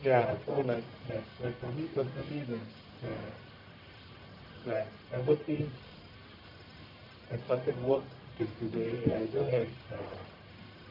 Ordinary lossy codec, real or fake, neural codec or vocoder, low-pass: MP3, 48 kbps; fake; codec, 44.1 kHz, 1.7 kbps, Pupu-Codec; 5.4 kHz